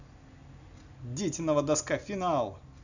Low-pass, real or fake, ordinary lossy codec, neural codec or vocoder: 7.2 kHz; real; none; none